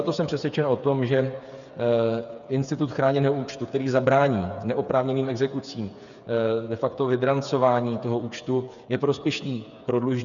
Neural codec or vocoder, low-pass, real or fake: codec, 16 kHz, 8 kbps, FreqCodec, smaller model; 7.2 kHz; fake